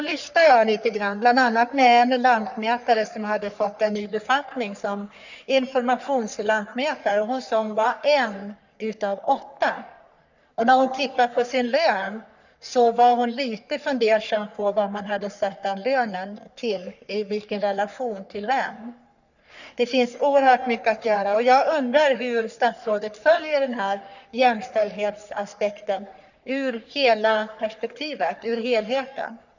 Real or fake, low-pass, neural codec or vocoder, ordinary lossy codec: fake; 7.2 kHz; codec, 44.1 kHz, 3.4 kbps, Pupu-Codec; none